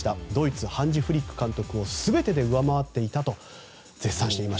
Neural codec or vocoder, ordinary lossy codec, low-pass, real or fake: none; none; none; real